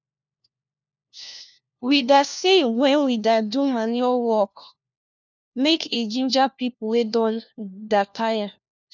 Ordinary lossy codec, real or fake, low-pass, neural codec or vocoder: none; fake; 7.2 kHz; codec, 16 kHz, 1 kbps, FunCodec, trained on LibriTTS, 50 frames a second